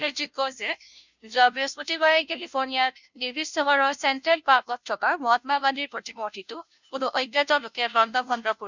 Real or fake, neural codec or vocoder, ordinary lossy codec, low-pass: fake; codec, 16 kHz, 0.5 kbps, FunCodec, trained on Chinese and English, 25 frames a second; none; 7.2 kHz